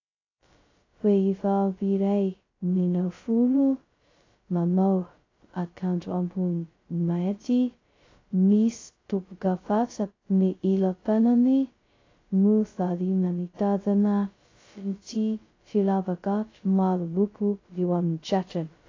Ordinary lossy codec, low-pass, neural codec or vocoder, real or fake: AAC, 32 kbps; 7.2 kHz; codec, 16 kHz, 0.2 kbps, FocalCodec; fake